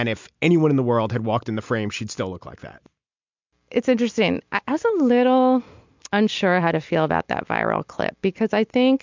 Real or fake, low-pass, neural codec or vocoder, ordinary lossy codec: real; 7.2 kHz; none; MP3, 64 kbps